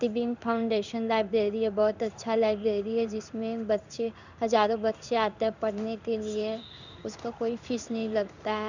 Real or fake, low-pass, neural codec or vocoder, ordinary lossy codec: fake; 7.2 kHz; codec, 16 kHz in and 24 kHz out, 1 kbps, XY-Tokenizer; none